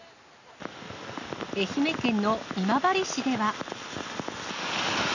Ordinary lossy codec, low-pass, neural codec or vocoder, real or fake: none; 7.2 kHz; none; real